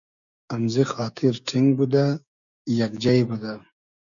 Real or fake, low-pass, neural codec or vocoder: fake; 7.2 kHz; codec, 16 kHz, 6 kbps, DAC